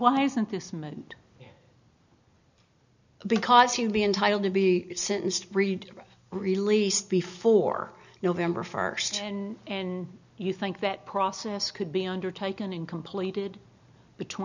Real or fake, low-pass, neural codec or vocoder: real; 7.2 kHz; none